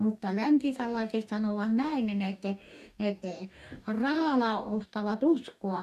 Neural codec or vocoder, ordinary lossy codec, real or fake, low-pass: codec, 44.1 kHz, 2.6 kbps, DAC; none; fake; 14.4 kHz